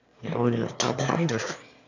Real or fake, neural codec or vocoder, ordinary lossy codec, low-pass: fake; autoencoder, 22.05 kHz, a latent of 192 numbers a frame, VITS, trained on one speaker; none; 7.2 kHz